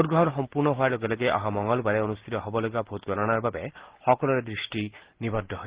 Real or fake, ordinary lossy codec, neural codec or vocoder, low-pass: real; Opus, 16 kbps; none; 3.6 kHz